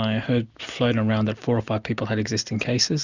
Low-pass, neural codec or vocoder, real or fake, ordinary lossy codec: 7.2 kHz; none; real; Opus, 64 kbps